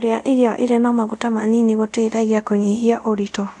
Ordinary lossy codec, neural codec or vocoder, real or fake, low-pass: none; codec, 24 kHz, 0.5 kbps, DualCodec; fake; 10.8 kHz